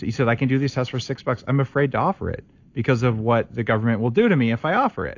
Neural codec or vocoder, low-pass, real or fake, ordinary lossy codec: none; 7.2 kHz; real; AAC, 48 kbps